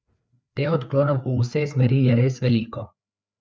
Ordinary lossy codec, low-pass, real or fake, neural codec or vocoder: none; none; fake; codec, 16 kHz, 4 kbps, FreqCodec, larger model